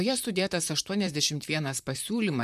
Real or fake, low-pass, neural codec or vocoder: fake; 14.4 kHz; vocoder, 44.1 kHz, 128 mel bands, Pupu-Vocoder